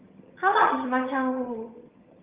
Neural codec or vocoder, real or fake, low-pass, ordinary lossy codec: vocoder, 22.05 kHz, 80 mel bands, HiFi-GAN; fake; 3.6 kHz; Opus, 32 kbps